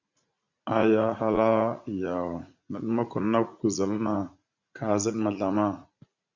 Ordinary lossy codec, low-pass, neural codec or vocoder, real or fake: Opus, 64 kbps; 7.2 kHz; vocoder, 44.1 kHz, 80 mel bands, Vocos; fake